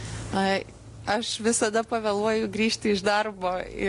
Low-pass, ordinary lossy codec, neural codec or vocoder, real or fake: 10.8 kHz; AAC, 48 kbps; none; real